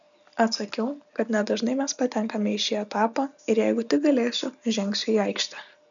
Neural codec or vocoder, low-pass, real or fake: none; 7.2 kHz; real